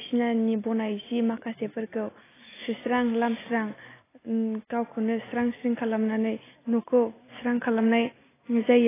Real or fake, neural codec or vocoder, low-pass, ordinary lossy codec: real; none; 3.6 kHz; AAC, 16 kbps